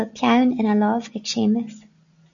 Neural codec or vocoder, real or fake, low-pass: none; real; 7.2 kHz